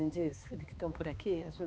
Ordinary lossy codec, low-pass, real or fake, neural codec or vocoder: none; none; fake; codec, 16 kHz, 2 kbps, X-Codec, HuBERT features, trained on balanced general audio